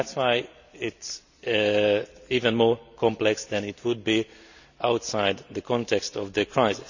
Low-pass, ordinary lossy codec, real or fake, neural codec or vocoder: 7.2 kHz; none; real; none